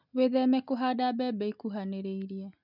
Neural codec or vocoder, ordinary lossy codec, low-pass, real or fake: none; none; 5.4 kHz; real